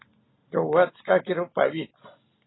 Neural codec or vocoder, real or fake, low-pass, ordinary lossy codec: none; real; 7.2 kHz; AAC, 16 kbps